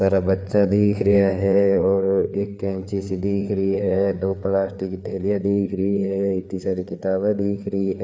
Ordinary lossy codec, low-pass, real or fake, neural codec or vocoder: none; none; fake; codec, 16 kHz, 4 kbps, FreqCodec, larger model